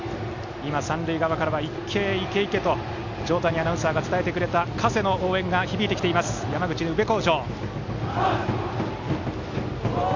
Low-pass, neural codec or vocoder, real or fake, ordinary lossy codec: 7.2 kHz; none; real; none